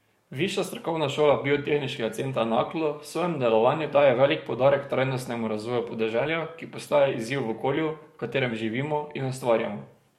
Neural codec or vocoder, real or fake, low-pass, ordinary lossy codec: codec, 44.1 kHz, 7.8 kbps, DAC; fake; 19.8 kHz; MP3, 64 kbps